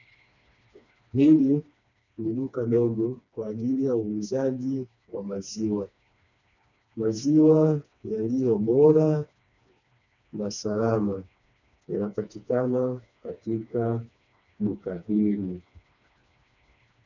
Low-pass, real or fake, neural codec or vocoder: 7.2 kHz; fake; codec, 16 kHz, 2 kbps, FreqCodec, smaller model